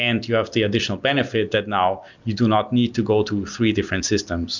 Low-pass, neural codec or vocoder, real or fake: 7.2 kHz; none; real